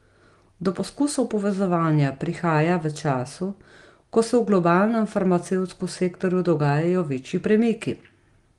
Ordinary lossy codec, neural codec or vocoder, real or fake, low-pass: Opus, 24 kbps; none; real; 10.8 kHz